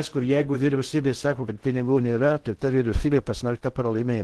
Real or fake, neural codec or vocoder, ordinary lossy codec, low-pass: fake; codec, 16 kHz in and 24 kHz out, 0.6 kbps, FocalCodec, streaming, 2048 codes; Opus, 16 kbps; 10.8 kHz